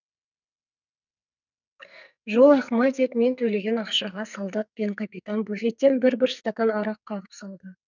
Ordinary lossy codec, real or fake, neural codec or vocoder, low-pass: none; fake; codec, 44.1 kHz, 2.6 kbps, SNAC; 7.2 kHz